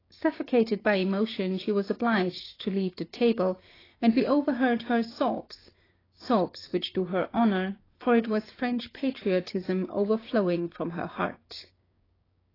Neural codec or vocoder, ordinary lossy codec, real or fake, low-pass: codec, 16 kHz, 6 kbps, DAC; AAC, 24 kbps; fake; 5.4 kHz